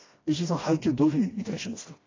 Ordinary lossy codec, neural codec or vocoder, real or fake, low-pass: AAC, 32 kbps; codec, 16 kHz, 1 kbps, FreqCodec, smaller model; fake; 7.2 kHz